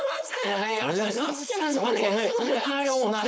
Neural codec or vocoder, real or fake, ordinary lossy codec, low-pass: codec, 16 kHz, 4.8 kbps, FACodec; fake; none; none